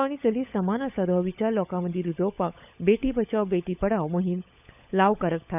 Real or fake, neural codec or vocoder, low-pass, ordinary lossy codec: fake; codec, 16 kHz, 16 kbps, FunCodec, trained on LibriTTS, 50 frames a second; 3.6 kHz; none